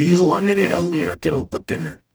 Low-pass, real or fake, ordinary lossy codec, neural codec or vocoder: none; fake; none; codec, 44.1 kHz, 0.9 kbps, DAC